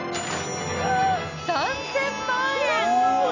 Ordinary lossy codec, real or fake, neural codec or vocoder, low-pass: none; real; none; 7.2 kHz